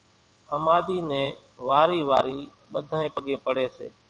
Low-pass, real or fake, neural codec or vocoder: 9.9 kHz; fake; vocoder, 22.05 kHz, 80 mel bands, WaveNeXt